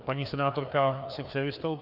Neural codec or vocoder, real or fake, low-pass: codec, 16 kHz, 2 kbps, FreqCodec, larger model; fake; 5.4 kHz